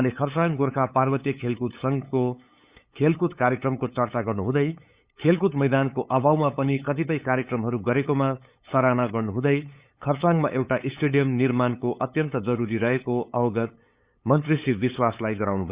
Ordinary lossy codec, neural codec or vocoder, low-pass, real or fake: Opus, 64 kbps; codec, 16 kHz, 8 kbps, FunCodec, trained on LibriTTS, 25 frames a second; 3.6 kHz; fake